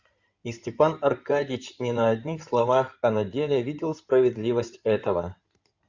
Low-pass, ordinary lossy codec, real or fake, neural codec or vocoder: 7.2 kHz; Opus, 64 kbps; fake; codec, 16 kHz in and 24 kHz out, 2.2 kbps, FireRedTTS-2 codec